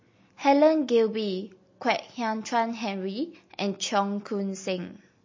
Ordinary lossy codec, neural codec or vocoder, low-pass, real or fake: MP3, 32 kbps; none; 7.2 kHz; real